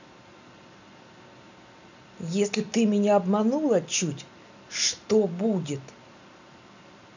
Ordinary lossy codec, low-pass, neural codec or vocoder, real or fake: none; 7.2 kHz; none; real